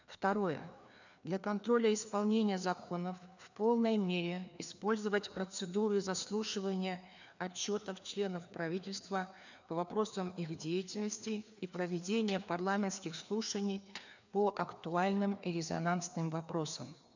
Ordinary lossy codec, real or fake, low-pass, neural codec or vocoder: none; fake; 7.2 kHz; codec, 16 kHz, 2 kbps, FreqCodec, larger model